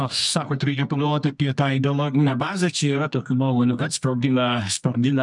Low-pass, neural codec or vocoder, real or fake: 10.8 kHz; codec, 24 kHz, 0.9 kbps, WavTokenizer, medium music audio release; fake